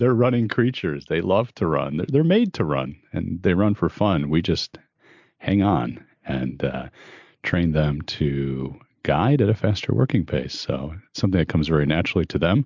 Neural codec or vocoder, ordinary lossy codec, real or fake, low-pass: none; MP3, 64 kbps; real; 7.2 kHz